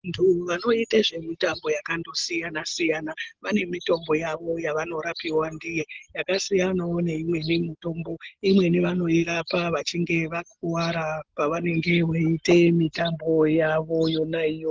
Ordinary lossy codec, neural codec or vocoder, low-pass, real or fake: Opus, 16 kbps; none; 7.2 kHz; real